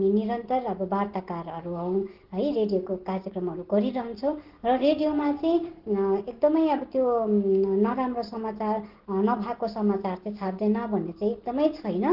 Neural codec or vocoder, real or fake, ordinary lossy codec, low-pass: none; real; Opus, 16 kbps; 5.4 kHz